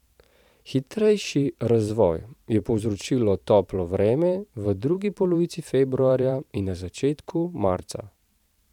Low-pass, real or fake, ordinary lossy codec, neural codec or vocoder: 19.8 kHz; fake; none; vocoder, 44.1 kHz, 128 mel bands every 512 samples, BigVGAN v2